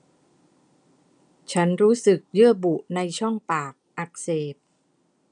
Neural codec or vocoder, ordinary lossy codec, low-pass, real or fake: none; none; 9.9 kHz; real